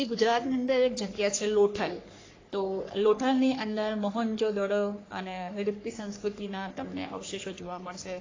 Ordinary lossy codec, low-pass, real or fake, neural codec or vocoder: AAC, 32 kbps; 7.2 kHz; fake; codec, 44.1 kHz, 3.4 kbps, Pupu-Codec